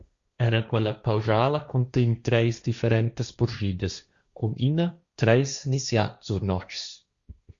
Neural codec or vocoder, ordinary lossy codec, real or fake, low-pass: codec, 16 kHz, 1.1 kbps, Voila-Tokenizer; Opus, 64 kbps; fake; 7.2 kHz